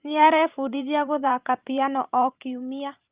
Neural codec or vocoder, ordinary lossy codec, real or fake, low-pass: vocoder, 44.1 kHz, 128 mel bands every 512 samples, BigVGAN v2; Opus, 24 kbps; fake; 3.6 kHz